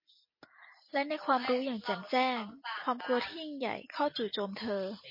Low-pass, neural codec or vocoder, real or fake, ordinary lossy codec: 5.4 kHz; none; real; MP3, 24 kbps